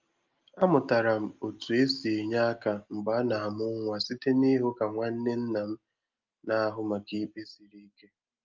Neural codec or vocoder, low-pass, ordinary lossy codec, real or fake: none; 7.2 kHz; Opus, 24 kbps; real